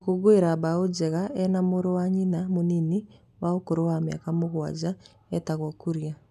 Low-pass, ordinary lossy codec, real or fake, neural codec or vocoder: 14.4 kHz; none; real; none